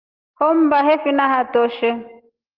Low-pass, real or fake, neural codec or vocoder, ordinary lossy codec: 5.4 kHz; real; none; Opus, 32 kbps